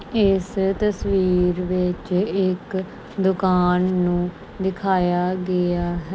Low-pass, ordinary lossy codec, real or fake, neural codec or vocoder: none; none; real; none